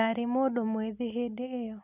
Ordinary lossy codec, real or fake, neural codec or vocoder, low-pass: none; real; none; 3.6 kHz